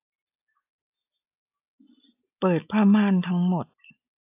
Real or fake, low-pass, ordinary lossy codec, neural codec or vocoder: real; 3.6 kHz; AAC, 32 kbps; none